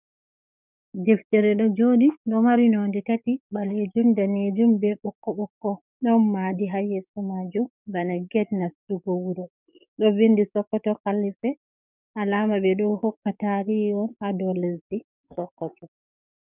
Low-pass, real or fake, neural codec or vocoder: 3.6 kHz; fake; codec, 44.1 kHz, 7.8 kbps, DAC